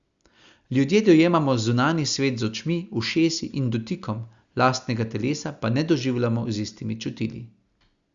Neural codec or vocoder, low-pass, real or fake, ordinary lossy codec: none; 7.2 kHz; real; Opus, 64 kbps